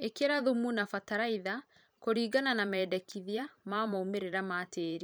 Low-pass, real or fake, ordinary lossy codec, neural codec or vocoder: none; fake; none; vocoder, 44.1 kHz, 128 mel bands every 512 samples, BigVGAN v2